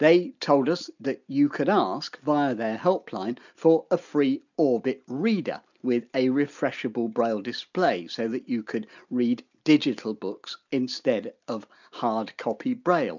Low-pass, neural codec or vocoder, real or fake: 7.2 kHz; none; real